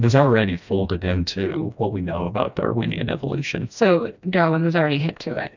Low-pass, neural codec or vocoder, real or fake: 7.2 kHz; codec, 16 kHz, 1 kbps, FreqCodec, smaller model; fake